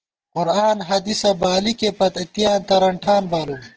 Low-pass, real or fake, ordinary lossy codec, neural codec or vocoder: 7.2 kHz; real; Opus, 16 kbps; none